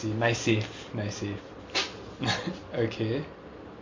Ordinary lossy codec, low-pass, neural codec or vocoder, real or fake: MP3, 48 kbps; 7.2 kHz; none; real